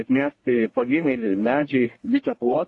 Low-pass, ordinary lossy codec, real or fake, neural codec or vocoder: 10.8 kHz; AAC, 48 kbps; fake; codec, 44.1 kHz, 1.7 kbps, Pupu-Codec